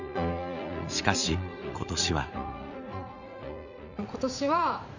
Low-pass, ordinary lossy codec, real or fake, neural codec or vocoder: 7.2 kHz; none; fake; vocoder, 44.1 kHz, 80 mel bands, Vocos